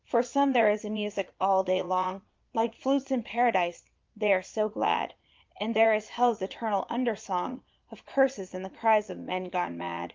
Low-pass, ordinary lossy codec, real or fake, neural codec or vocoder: 7.2 kHz; Opus, 32 kbps; fake; vocoder, 22.05 kHz, 80 mel bands, Vocos